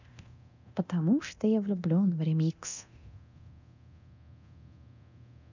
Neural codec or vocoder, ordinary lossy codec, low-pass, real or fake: codec, 24 kHz, 0.9 kbps, DualCodec; none; 7.2 kHz; fake